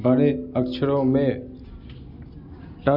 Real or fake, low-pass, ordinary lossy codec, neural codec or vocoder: real; 5.4 kHz; none; none